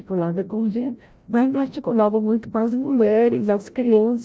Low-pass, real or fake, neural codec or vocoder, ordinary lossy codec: none; fake; codec, 16 kHz, 0.5 kbps, FreqCodec, larger model; none